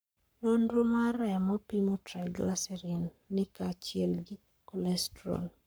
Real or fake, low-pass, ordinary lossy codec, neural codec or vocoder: fake; none; none; codec, 44.1 kHz, 7.8 kbps, Pupu-Codec